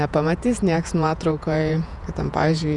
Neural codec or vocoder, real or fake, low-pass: vocoder, 48 kHz, 128 mel bands, Vocos; fake; 10.8 kHz